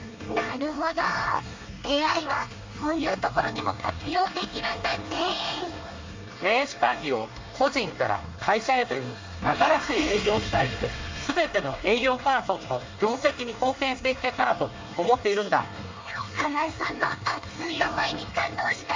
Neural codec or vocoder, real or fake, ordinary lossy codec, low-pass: codec, 24 kHz, 1 kbps, SNAC; fake; MP3, 64 kbps; 7.2 kHz